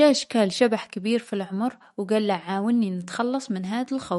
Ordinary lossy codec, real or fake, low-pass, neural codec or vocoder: MP3, 48 kbps; fake; 19.8 kHz; autoencoder, 48 kHz, 128 numbers a frame, DAC-VAE, trained on Japanese speech